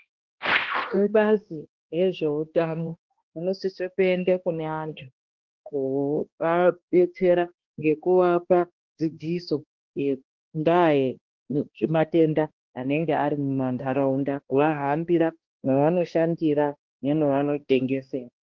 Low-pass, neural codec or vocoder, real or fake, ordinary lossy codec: 7.2 kHz; codec, 16 kHz, 1 kbps, X-Codec, HuBERT features, trained on balanced general audio; fake; Opus, 16 kbps